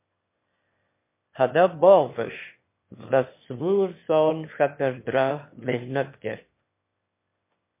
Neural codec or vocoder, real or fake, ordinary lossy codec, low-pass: autoencoder, 22.05 kHz, a latent of 192 numbers a frame, VITS, trained on one speaker; fake; MP3, 24 kbps; 3.6 kHz